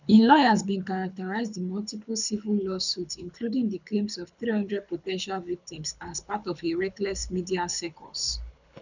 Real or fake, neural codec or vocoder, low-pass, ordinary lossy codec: fake; codec, 24 kHz, 6 kbps, HILCodec; 7.2 kHz; none